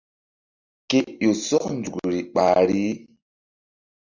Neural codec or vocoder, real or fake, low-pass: none; real; 7.2 kHz